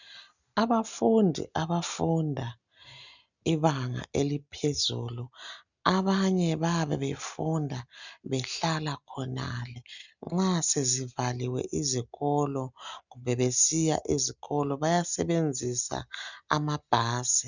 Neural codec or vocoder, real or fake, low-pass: none; real; 7.2 kHz